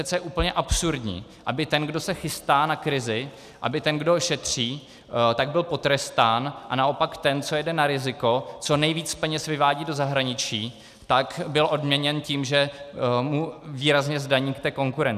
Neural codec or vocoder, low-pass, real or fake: none; 14.4 kHz; real